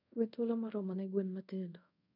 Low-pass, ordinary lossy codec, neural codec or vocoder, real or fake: 5.4 kHz; none; codec, 24 kHz, 0.5 kbps, DualCodec; fake